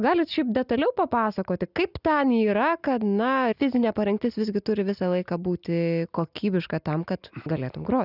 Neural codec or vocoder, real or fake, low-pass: none; real; 5.4 kHz